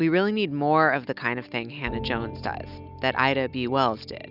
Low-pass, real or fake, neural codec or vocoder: 5.4 kHz; real; none